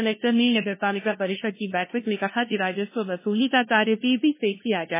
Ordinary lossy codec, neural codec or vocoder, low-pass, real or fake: MP3, 16 kbps; codec, 16 kHz, 0.5 kbps, FunCodec, trained on LibriTTS, 25 frames a second; 3.6 kHz; fake